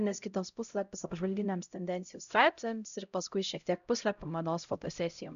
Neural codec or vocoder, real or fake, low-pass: codec, 16 kHz, 0.5 kbps, X-Codec, HuBERT features, trained on LibriSpeech; fake; 7.2 kHz